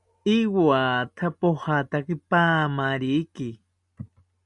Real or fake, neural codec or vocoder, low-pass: real; none; 10.8 kHz